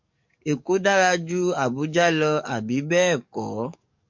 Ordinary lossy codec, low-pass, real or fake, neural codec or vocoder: MP3, 48 kbps; 7.2 kHz; fake; codec, 44.1 kHz, 7.8 kbps, DAC